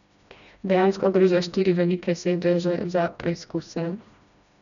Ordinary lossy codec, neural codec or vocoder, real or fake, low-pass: none; codec, 16 kHz, 1 kbps, FreqCodec, smaller model; fake; 7.2 kHz